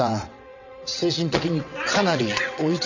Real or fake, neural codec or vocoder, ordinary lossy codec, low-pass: fake; vocoder, 22.05 kHz, 80 mel bands, WaveNeXt; none; 7.2 kHz